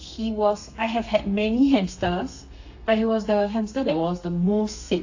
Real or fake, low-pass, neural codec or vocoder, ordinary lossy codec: fake; 7.2 kHz; codec, 32 kHz, 1.9 kbps, SNAC; AAC, 48 kbps